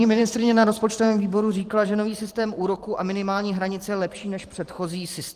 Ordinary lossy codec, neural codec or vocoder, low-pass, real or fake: Opus, 24 kbps; vocoder, 44.1 kHz, 128 mel bands every 512 samples, BigVGAN v2; 14.4 kHz; fake